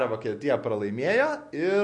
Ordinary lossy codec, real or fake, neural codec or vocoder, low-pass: MP3, 48 kbps; real; none; 10.8 kHz